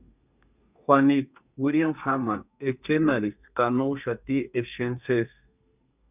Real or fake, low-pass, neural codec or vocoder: fake; 3.6 kHz; codec, 32 kHz, 1.9 kbps, SNAC